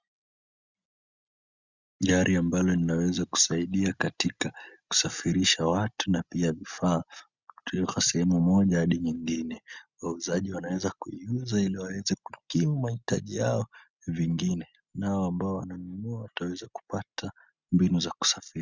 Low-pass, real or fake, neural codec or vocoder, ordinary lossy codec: 7.2 kHz; real; none; Opus, 64 kbps